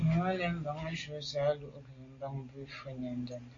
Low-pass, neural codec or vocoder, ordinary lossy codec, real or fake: 7.2 kHz; none; AAC, 32 kbps; real